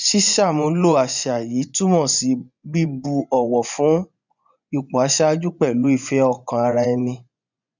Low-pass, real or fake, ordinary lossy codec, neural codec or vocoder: 7.2 kHz; fake; none; vocoder, 24 kHz, 100 mel bands, Vocos